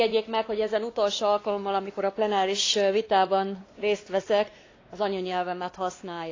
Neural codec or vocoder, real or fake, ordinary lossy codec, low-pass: codec, 16 kHz, 2 kbps, X-Codec, WavLM features, trained on Multilingual LibriSpeech; fake; AAC, 32 kbps; 7.2 kHz